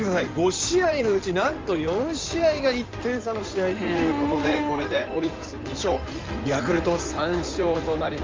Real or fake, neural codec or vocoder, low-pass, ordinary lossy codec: fake; codec, 16 kHz in and 24 kHz out, 2.2 kbps, FireRedTTS-2 codec; 7.2 kHz; Opus, 24 kbps